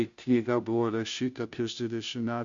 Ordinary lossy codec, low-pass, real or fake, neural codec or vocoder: Opus, 64 kbps; 7.2 kHz; fake; codec, 16 kHz, 0.5 kbps, FunCodec, trained on Chinese and English, 25 frames a second